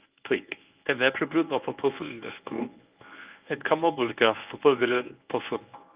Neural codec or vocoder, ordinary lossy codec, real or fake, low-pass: codec, 24 kHz, 0.9 kbps, WavTokenizer, medium speech release version 1; Opus, 32 kbps; fake; 3.6 kHz